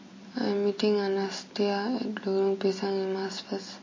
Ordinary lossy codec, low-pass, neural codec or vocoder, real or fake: MP3, 32 kbps; 7.2 kHz; none; real